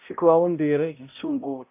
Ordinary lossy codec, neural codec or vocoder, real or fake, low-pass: AAC, 32 kbps; codec, 16 kHz, 0.5 kbps, X-Codec, HuBERT features, trained on balanced general audio; fake; 3.6 kHz